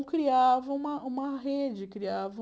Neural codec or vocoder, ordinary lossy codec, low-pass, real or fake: none; none; none; real